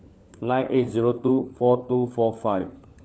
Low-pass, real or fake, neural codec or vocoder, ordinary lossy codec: none; fake; codec, 16 kHz, 4 kbps, FunCodec, trained on LibriTTS, 50 frames a second; none